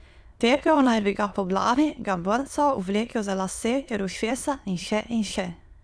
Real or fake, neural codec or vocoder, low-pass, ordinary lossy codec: fake; autoencoder, 22.05 kHz, a latent of 192 numbers a frame, VITS, trained on many speakers; none; none